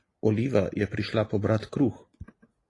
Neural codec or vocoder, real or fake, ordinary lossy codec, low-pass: none; real; AAC, 32 kbps; 10.8 kHz